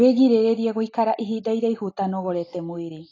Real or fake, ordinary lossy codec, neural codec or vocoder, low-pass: real; AAC, 32 kbps; none; 7.2 kHz